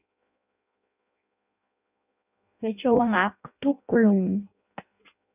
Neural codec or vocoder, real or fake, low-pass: codec, 16 kHz in and 24 kHz out, 0.6 kbps, FireRedTTS-2 codec; fake; 3.6 kHz